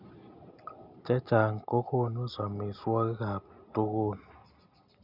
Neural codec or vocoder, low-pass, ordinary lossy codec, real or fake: none; 5.4 kHz; none; real